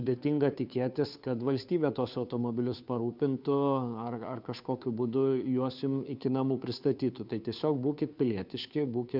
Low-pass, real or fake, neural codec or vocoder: 5.4 kHz; fake; codec, 16 kHz, 2 kbps, FunCodec, trained on Chinese and English, 25 frames a second